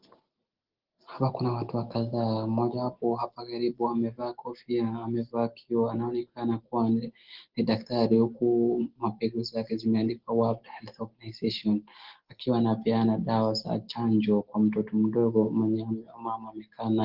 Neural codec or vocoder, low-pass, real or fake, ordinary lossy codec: none; 5.4 kHz; real; Opus, 16 kbps